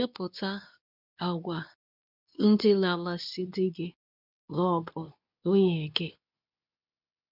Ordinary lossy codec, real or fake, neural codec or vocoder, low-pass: none; fake; codec, 24 kHz, 0.9 kbps, WavTokenizer, medium speech release version 2; 5.4 kHz